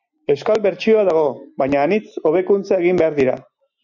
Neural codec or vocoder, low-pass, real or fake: none; 7.2 kHz; real